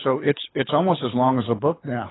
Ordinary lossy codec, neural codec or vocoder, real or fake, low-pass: AAC, 16 kbps; codec, 24 kHz, 6 kbps, HILCodec; fake; 7.2 kHz